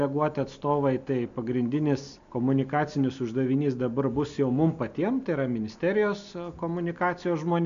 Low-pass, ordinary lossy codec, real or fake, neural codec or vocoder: 7.2 kHz; AAC, 96 kbps; real; none